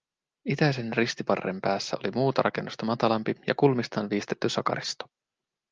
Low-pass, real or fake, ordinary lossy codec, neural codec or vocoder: 7.2 kHz; real; Opus, 24 kbps; none